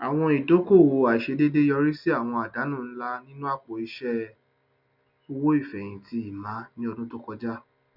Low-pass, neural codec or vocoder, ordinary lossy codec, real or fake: 5.4 kHz; none; none; real